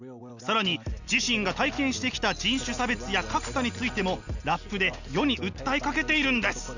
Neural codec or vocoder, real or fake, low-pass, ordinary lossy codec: none; real; 7.2 kHz; none